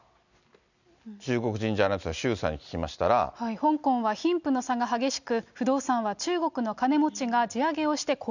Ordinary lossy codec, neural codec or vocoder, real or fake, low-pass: none; none; real; 7.2 kHz